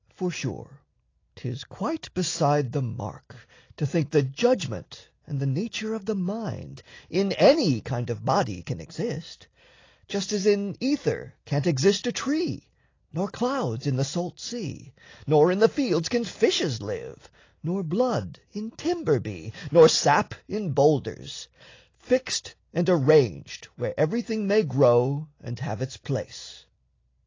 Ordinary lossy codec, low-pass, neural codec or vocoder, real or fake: AAC, 32 kbps; 7.2 kHz; none; real